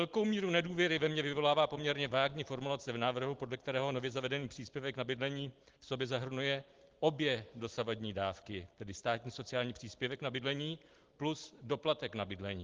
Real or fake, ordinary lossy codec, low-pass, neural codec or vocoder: real; Opus, 16 kbps; 7.2 kHz; none